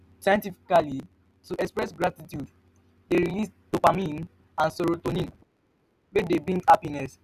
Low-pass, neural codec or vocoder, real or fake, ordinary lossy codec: 14.4 kHz; none; real; none